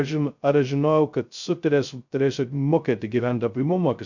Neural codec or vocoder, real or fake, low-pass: codec, 16 kHz, 0.2 kbps, FocalCodec; fake; 7.2 kHz